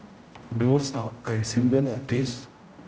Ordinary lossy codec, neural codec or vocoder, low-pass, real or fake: none; codec, 16 kHz, 0.5 kbps, X-Codec, HuBERT features, trained on general audio; none; fake